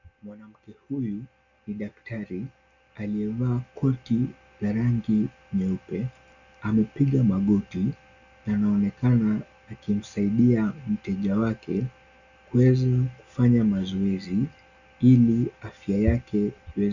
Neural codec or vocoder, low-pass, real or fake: none; 7.2 kHz; real